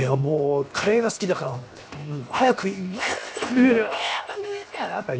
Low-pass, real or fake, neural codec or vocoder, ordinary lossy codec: none; fake; codec, 16 kHz, 0.7 kbps, FocalCodec; none